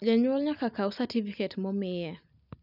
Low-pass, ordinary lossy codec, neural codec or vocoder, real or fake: 5.4 kHz; none; none; real